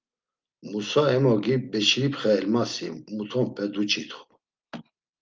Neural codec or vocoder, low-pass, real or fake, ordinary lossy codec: none; 7.2 kHz; real; Opus, 32 kbps